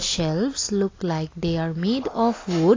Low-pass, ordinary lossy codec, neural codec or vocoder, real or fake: 7.2 kHz; AAC, 32 kbps; none; real